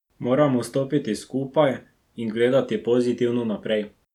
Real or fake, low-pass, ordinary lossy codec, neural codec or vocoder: real; 19.8 kHz; none; none